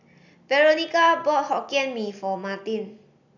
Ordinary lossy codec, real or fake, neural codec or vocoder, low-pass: none; real; none; 7.2 kHz